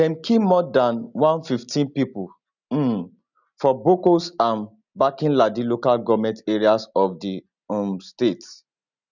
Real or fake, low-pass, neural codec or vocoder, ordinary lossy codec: real; 7.2 kHz; none; none